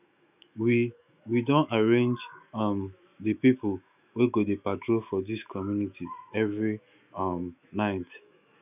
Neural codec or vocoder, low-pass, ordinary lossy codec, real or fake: autoencoder, 48 kHz, 128 numbers a frame, DAC-VAE, trained on Japanese speech; 3.6 kHz; none; fake